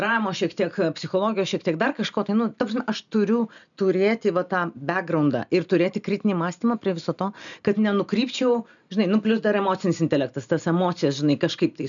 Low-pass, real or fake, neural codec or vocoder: 7.2 kHz; real; none